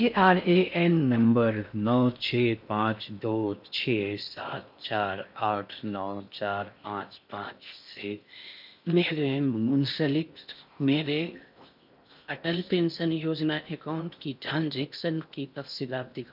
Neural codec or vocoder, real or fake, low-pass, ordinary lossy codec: codec, 16 kHz in and 24 kHz out, 0.6 kbps, FocalCodec, streaming, 4096 codes; fake; 5.4 kHz; none